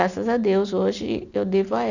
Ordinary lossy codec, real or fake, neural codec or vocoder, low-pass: none; real; none; 7.2 kHz